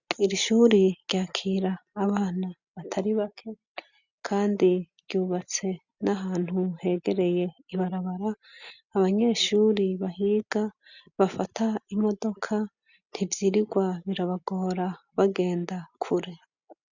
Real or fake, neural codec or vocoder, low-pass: real; none; 7.2 kHz